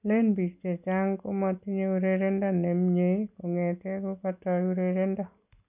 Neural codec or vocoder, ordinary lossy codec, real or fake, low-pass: none; none; real; 3.6 kHz